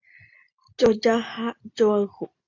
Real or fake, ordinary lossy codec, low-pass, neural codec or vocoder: real; AAC, 48 kbps; 7.2 kHz; none